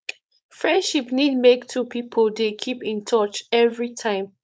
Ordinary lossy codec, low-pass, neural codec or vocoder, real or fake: none; none; codec, 16 kHz, 4.8 kbps, FACodec; fake